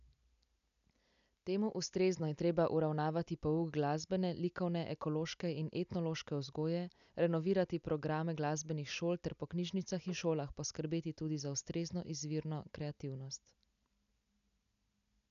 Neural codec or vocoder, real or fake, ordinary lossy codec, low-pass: none; real; none; 7.2 kHz